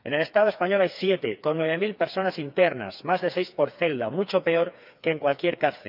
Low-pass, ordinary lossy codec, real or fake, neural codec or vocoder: 5.4 kHz; none; fake; codec, 16 kHz, 4 kbps, FreqCodec, smaller model